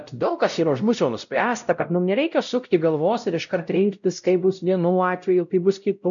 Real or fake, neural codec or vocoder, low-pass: fake; codec, 16 kHz, 0.5 kbps, X-Codec, WavLM features, trained on Multilingual LibriSpeech; 7.2 kHz